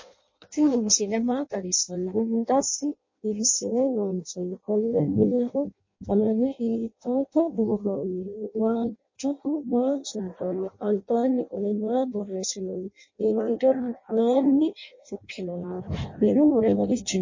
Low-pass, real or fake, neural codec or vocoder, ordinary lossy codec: 7.2 kHz; fake; codec, 16 kHz in and 24 kHz out, 0.6 kbps, FireRedTTS-2 codec; MP3, 32 kbps